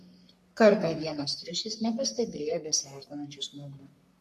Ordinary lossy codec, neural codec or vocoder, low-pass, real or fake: MP3, 64 kbps; codec, 44.1 kHz, 3.4 kbps, Pupu-Codec; 14.4 kHz; fake